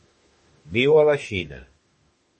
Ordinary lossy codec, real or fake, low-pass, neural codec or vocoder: MP3, 32 kbps; fake; 10.8 kHz; autoencoder, 48 kHz, 32 numbers a frame, DAC-VAE, trained on Japanese speech